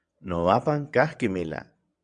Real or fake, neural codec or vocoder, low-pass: fake; vocoder, 22.05 kHz, 80 mel bands, WaveNeXt; 9.9 kHz